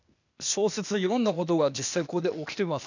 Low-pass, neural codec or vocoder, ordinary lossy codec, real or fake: 7.2 kHz; codec, 16 kHz, 0.8 kbps, ZipCodec; none; fake